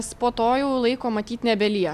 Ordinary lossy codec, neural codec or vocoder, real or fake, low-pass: AAC, 96 kbps; none; real; 14.4 kHz